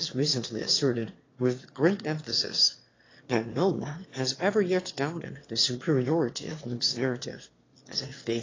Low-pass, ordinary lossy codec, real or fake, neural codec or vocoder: 7.2 kHz; AAC, 32 kbps; fake; autoencoder, 22.05 kHz, a latent of 192 numbers a frame, VITS, trained on one speaker